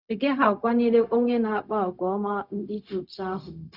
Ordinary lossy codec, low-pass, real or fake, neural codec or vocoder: none; 5.4 kHz; fake; codec, 16 kHz, 0.4 kbps, LongCat-Audio-Codec